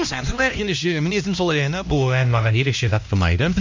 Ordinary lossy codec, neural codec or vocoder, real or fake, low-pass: MP3, 48 kbps; codec, 16 kHz, 1 kbps, X-Codec, HuBERT features, trained on LibriSpeech; fake; 7.2 kHz